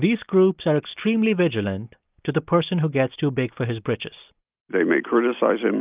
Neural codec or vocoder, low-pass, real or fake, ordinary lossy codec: none; 3.6 kHz; real; Opus, 24 kbps